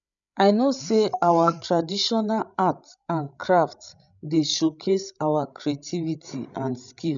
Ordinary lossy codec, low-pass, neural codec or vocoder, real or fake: none; 7.2 kHz; codec, 16 kHz, 16 kbps, FreqCodec, larger model; fake